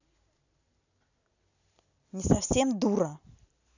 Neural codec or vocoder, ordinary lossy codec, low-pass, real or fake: none; none; 7.2 kHz; real